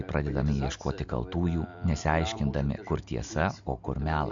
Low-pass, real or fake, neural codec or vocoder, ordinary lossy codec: 7.2 kHz; real; none; MP3, 64 kbps